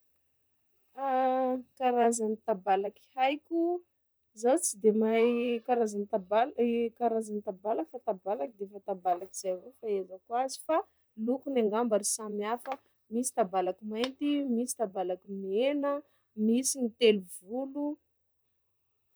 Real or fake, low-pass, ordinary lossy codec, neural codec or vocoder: fake; none; none; vocoder, 44.1 kHz, 128 mel bands, Pupu-Vocoder